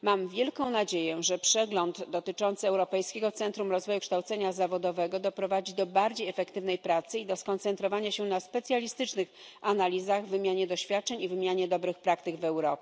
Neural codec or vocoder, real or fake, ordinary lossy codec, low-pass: none; real; none; none